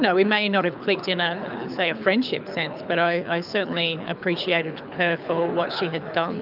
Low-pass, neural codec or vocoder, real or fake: 5.4 kHz; codec, 24 kHz, 6 kbps, HILCodec; fake